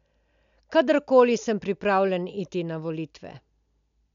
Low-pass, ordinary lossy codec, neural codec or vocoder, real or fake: 7.2 kHz; none; none; real